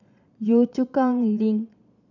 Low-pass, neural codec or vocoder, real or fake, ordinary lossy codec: 7.2 kHz; vocoder, 22.05 kHz, 80 mel bands, Vocos; fake; none